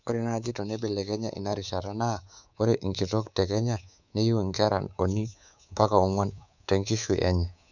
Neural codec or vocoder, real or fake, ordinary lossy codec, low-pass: codec, 24 kHz, 3.1 kbps, DualCodec; fake; none; 7.2 kHz